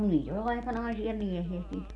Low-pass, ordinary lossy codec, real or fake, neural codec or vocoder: none; none; real; none